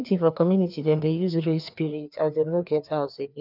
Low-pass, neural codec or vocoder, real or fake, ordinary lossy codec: 5.4 kHz; codec, 16 kHz, 2 kbps, FreqCodec, larger model; fake; none